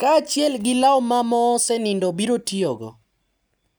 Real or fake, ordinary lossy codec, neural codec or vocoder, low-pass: real; none; none; none